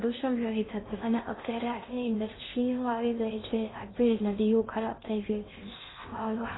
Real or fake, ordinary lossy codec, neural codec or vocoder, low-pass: fake; AAC, 16 kbps; codec, 16 kHz in and 24 kHz out, 0.6 kbps, FocalCodec, streaming, 2048 codes; 7.2 kHz